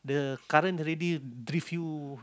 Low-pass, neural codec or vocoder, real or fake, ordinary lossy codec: none; none; real; none